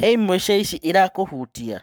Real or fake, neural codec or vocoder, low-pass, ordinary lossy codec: fake; codec, 44.1 kHz, 7.8 kbps, Pupu-Codec; none; none